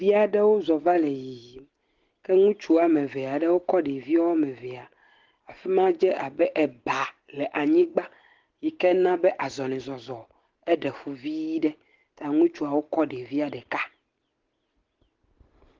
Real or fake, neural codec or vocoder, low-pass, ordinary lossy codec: real; none; 7.2 kHz; Opus, 16 kbps